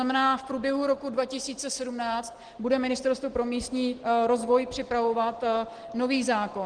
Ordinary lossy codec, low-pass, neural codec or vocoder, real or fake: Opus, 16 kbps; 9.9 kHz; none; real